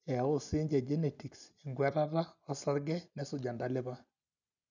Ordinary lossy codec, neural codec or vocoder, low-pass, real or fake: none; none; 7.2 kHz; real